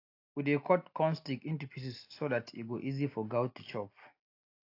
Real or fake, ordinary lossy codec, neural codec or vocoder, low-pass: real; AAC, 32 kbps; none; 5.4 kHz